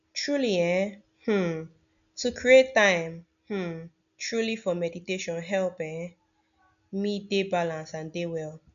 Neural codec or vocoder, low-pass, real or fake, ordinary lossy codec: none; 7.2 kHz; real; none